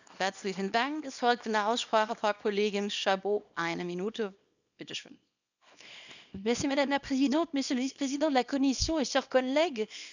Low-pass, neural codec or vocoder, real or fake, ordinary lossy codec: 7.2 kHz; codec, 24 kHz, 0.9 kbps, WavTokenizer, small release; fake; none